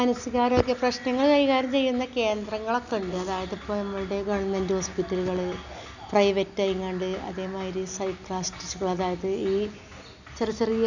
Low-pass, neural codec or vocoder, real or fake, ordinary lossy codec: 7.2 kHz; none; real; none